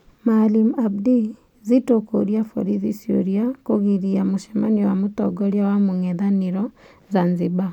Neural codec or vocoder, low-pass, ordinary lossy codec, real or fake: none; 19.8 kHz; none; real